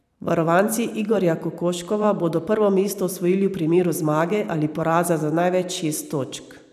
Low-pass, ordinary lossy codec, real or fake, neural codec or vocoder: 14.4 kHz; none; fake; vocoder, 44.1 kHz, 128 mel bands every 512 samples, BigVGAN v2